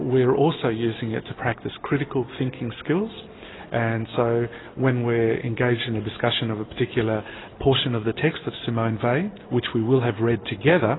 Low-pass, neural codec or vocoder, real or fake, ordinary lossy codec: 7.2 kHz; none; real; AAC, 16 kbps